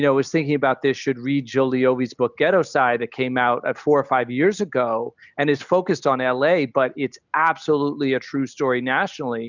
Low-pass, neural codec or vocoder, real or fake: 7.2 kHz; none; real